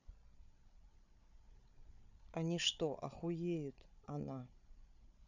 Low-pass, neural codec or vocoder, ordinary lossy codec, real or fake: 7.2 kHz; codec, 16 kHz, 8 kbps, FreqCodec, larger model; none; fake